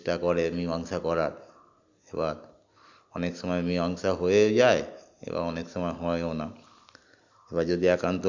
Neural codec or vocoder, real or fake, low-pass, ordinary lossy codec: none; real; 7.2 kHz; Opus, 64 kbps